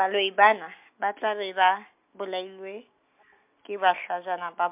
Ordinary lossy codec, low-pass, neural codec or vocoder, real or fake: MP3, 32 kbps; 3.6 kHz; none; real